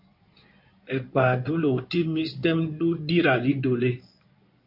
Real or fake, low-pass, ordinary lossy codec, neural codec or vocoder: fake; 5.4 kHz; AAC, 48 kbps; codec, 16 kHz in and 24 kHz out, 2.2 kbps, FireRedTTS-2 codec